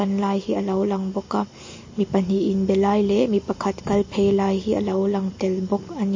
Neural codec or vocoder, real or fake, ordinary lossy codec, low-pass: none; real; MP3, 32 kbps; 7.2 kHz